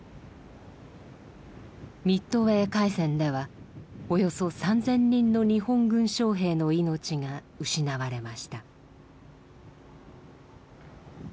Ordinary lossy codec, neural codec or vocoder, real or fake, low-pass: none; none; real; none